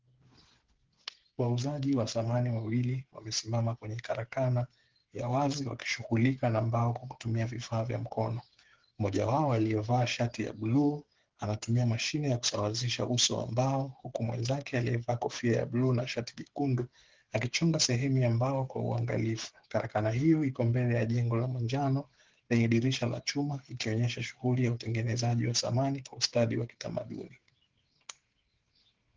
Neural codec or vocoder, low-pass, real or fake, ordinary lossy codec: codec, 16 kHz, 8 kbps, FreqCodec, smaller model; 7.2 kHz; fake; Opus, 16 kbps